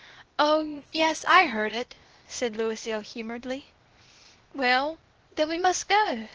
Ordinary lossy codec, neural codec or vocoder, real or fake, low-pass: Opus, 16 kbps; codec, 16 kHz, 0.8 kbps, ZipCodec; fake; 7.2 kHz